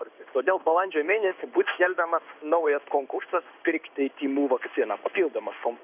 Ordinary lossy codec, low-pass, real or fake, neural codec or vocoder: AAC, 32 kbps; 3.6 kHz; fake; codec, 16 kHz in and 24 kHz out, 1 kbps, XY-Tokenizer